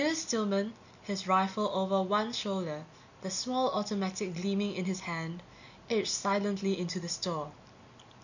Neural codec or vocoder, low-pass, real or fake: none; 7.2 kHz; real